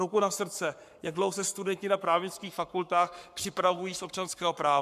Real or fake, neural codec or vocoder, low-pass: fake; codec, 44.1 kHz, 7.8 kbps, Pupu-Codec; 14.4 kHz